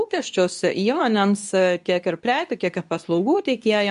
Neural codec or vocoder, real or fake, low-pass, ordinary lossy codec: codec, 24 kHz, 0.9 kbps, WavTokenizer, medium speech release version 2; fake; 10.8 kHz; MP3, 64 kbps